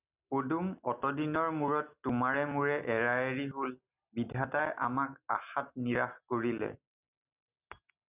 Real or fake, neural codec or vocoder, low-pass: real; none; 3.6 kHz